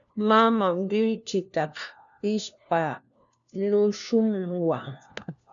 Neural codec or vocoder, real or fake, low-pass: codec, 16 kHz, 1 kbps, FunCodec, trained on LibriTTS, 50 frames a second; fake; 7.2 kHz